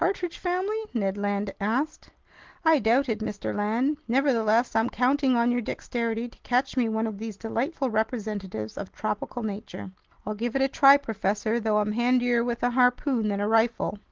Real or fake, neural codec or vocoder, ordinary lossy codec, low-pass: real; none; Opus, 16 kbps; 7.2 kHz